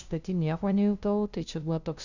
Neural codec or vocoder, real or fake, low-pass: codec, 16 kHz, 0.5 kbps, FunCodec, trained on LibriTTS, 25 frames a second; fake; 7.2 kHz